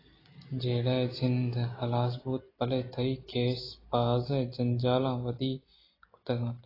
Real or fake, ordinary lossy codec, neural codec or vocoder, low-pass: real; AAC, 24 kbps; none; 5.4 kHz